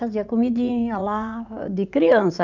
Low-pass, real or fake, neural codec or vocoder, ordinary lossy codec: 7.2 kHz; fake; vocoder, 44.1 kHz, 80 mel bands, Vocos; none